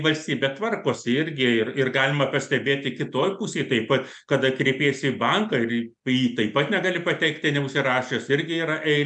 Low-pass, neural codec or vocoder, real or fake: 10.8 kHz; none; real